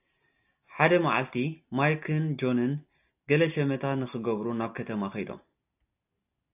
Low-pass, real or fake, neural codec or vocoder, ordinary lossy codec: 3.6 kHz; real; none; AAC, 32 kbps